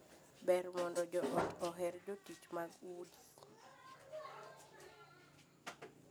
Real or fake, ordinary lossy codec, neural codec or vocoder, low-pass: real; none; none; none